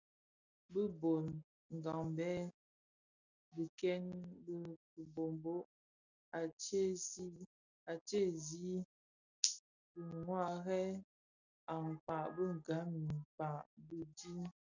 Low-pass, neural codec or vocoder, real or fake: 7.2 kHz; none; real